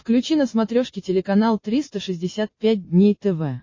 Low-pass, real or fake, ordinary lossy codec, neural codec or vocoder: 7.2 kHz; real; MP3, 32 kbps; none